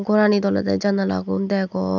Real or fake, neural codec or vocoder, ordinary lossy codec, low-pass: real; none; none; 7.2 kHz